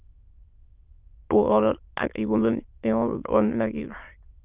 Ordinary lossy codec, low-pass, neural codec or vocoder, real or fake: Opus, 32 kbps; 3.6 kHz; autoencoder, 22.05 kHz, a latent of 192 numbers a frame, VITS, trained on many speakers; fake